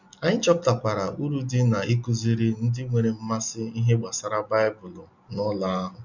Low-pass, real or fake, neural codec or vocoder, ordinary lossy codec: 7.2 kHz; real; none; none